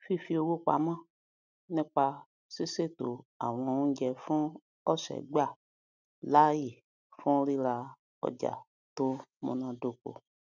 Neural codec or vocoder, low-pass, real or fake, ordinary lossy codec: none; 7.2 kHz; real; none